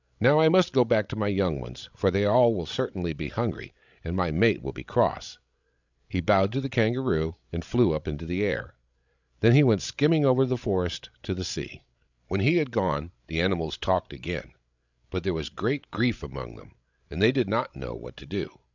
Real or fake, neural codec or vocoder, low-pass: fake; codec, 16 kHz, 16 kbps, FreqCodec, larger model; 7.2 kHz